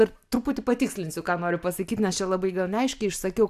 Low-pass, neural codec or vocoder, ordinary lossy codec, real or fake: 14.4 kHz; none; AAC, 96 kbps; real